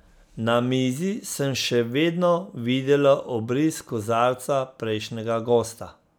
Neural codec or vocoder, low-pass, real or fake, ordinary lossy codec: none; none; real; none